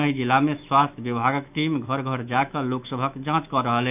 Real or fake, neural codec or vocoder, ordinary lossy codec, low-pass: fake; autoencoder, 48 kHz, 128 numbers a frame, DAC-VAE, trained on Japanese speech; none; 3.6 kHz